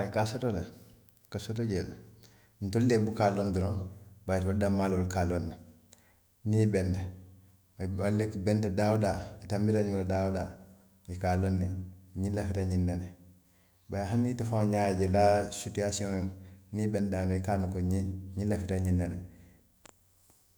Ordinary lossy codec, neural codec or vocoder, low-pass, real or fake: none; autoencoder, 48 kHz, 128 numbers a frame, DAC-VAE, trained on Japanese speech; none; fake